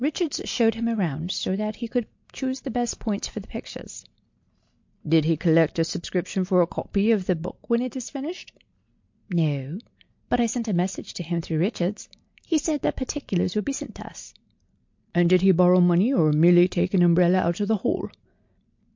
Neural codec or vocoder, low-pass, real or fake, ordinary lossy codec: none; 7.2 kHz; real; MP3, 48 kbps